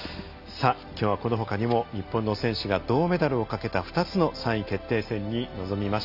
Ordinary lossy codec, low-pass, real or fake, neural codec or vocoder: none; 5.4 kHz; real; none